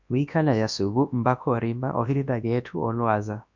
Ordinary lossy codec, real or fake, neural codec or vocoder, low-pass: MP3, 64 kbps; fake; codec, 24 kHz, 0.9 kbps, WavTokenizer, large speech release; 7.2 kHz